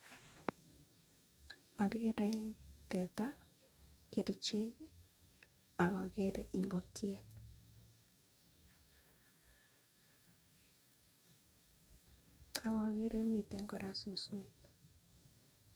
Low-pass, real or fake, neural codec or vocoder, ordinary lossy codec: none; fake; codec, 44.1 kHz, 2.6 kbps, DAC; none